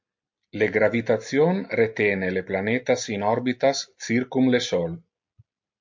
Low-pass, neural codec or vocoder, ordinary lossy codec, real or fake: 7.2 kHz; none; MP3, 48 kbps; real